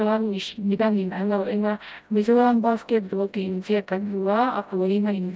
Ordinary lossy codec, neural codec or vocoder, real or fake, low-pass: none; codec, 16 kHz, 0.5 kbps, FreqCodec, smaller model; fake; none